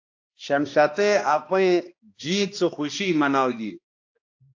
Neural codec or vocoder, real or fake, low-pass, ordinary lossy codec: codec, 16 kHz, 1 kbps, X-Codec, HuBERT features, trained on balanced general audio; fake; 7.2 kHz; AAC, 48 kbps